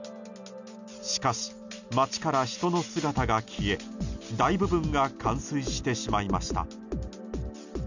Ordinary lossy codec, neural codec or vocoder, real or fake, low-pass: none; none; real; 7.2 kHz